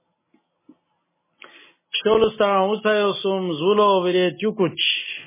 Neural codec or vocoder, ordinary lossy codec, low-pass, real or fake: none; MP3, 16 kbps; 3.6 kHz; real